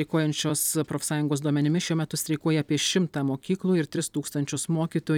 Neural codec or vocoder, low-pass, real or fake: vocoder, 44.1 kHz, 128 mel bands every 256 samples, BigVGAN v2; 19.8 kHz; fake